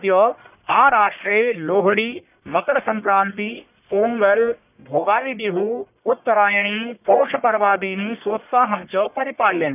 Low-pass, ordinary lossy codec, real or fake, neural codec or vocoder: 3.6 kHz; none; fake; codec, 44.1 kHz, 1.7 kbps, Pupu-Codec